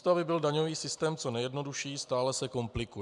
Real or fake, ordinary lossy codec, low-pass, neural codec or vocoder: real; MP3, 96 kbps; 10.8 kHz; none